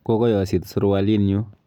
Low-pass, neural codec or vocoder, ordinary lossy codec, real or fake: 19.8 kHz; none; none; real